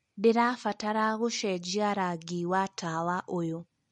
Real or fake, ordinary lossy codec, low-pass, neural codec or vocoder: real; MP3, 48 kbps; 19.8 kHz; none